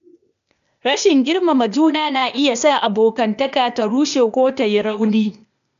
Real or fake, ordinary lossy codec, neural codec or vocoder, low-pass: fake; none; codec, 16 kHz, 0.8 kbps, ZipCodec; 7.2 kHz